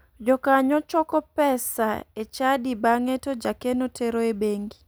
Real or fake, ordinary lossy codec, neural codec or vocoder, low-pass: real; none; none; none